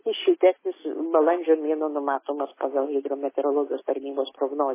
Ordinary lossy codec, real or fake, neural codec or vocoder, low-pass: MP3, 16 kbps; real; none; 3.6 kHz